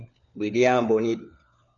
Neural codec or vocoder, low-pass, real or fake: codec, 16 kHz, 4 kbps, FunCodec, trained on LibriTTS, 50 frames a second; 7.2 kHz; fake